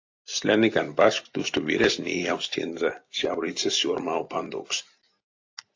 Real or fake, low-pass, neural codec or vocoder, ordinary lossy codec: real; 7.2 kHz; none; AAC, 48 kbps